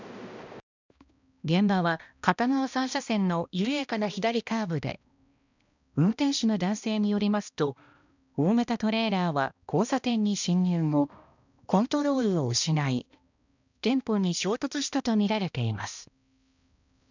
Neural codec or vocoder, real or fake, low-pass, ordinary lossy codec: codec, 16 kHz, 1 kbps, X-Codec, HuBERT features, trained on balanced general audio; fake; 7.2 kHz; none